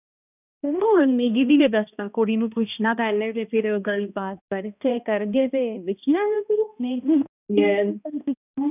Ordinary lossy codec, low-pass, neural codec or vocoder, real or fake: none; 3.6 kHz; codec, 16 kHz, 1 kbps, X-Codec, HuBERT features, trained on balanced general audio; fake